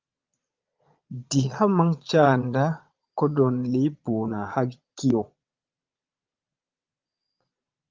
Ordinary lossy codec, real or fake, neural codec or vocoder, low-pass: Opus, 32 kbps; fake; vocoder, 22.05 kHz, 80 mel bands, Vocos; 7.2 kHz